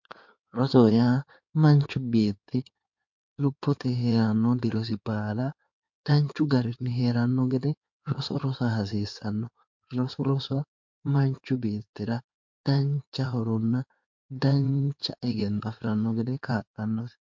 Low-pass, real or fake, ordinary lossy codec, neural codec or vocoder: 7.2 kHz; fake; MP3, 48 kbps; codec, 16 kHz in and 24 kHz out, 2.2 kbps, FireRedTTS-2 codec